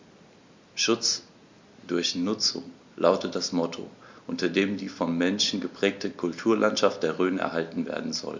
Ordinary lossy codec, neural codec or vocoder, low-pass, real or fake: MP3, 48 kbps; none; 7.2 kHz; real